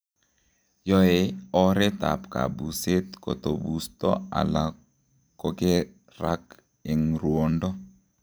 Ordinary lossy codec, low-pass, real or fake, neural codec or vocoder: none; none; real; none